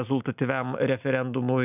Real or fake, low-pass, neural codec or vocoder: real; 3.6 kHz; none